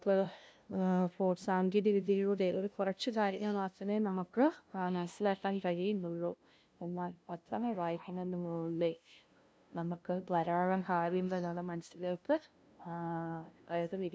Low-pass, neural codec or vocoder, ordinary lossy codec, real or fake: none; codec, 16 kHz, 0.5 kbps, FunCodec, trained on LibriTTS, 25 frames a second; none; fake